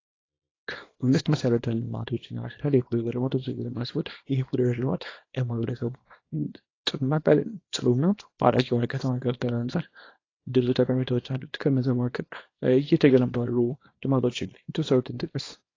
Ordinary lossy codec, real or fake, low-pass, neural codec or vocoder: AAC, 32 kbps; fake; 7.2 kHz; codec, 24 kHz, 0.9 kbps, WavTokenizer, small release